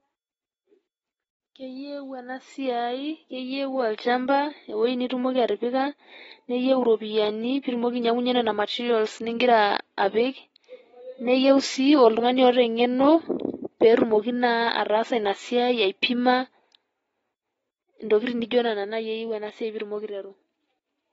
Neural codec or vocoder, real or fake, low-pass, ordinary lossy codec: none; real; 10.8 kHz; AAC, 24 kbps